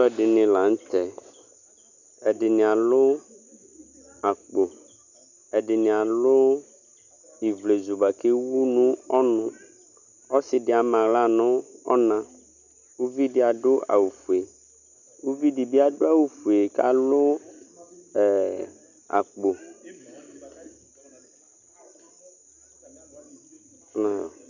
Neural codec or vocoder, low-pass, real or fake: none; 7.2 kHz; real